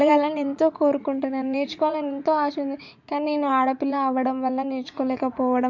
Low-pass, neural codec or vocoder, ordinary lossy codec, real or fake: 7.2 kHz; vocoder, 44.1 kHz, 80 mel bands, Vocos; MP3, 64 kbps; fake